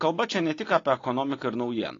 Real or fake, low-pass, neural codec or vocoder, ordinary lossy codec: real; 7.2 kHz; none; AAC, 32 kbps